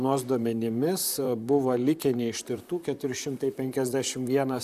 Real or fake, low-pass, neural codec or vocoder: fake; 14.4 kHz; vocoder, 44.1 kHz, 128 mel bands, Pupu-Vocoder